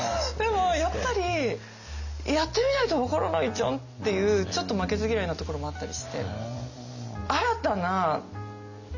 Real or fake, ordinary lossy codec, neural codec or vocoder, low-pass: real; none; none; 7.2 kHz